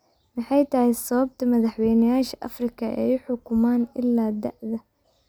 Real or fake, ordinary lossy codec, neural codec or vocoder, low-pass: real; none; none; none